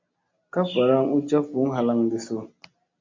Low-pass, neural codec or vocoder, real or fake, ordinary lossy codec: 7.2 kHz; none; real; MP3, 64 kbps